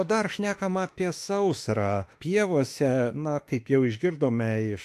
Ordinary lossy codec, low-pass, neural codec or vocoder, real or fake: AAC, 64 kbps; 14.4 kHz; autoencoder, 48 kHz, 32 numbers a frame, DAC-VAE, trained on Japanese speech; fake